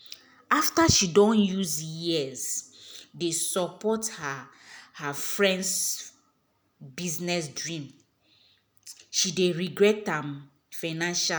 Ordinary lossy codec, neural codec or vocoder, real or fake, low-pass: none; none; real; none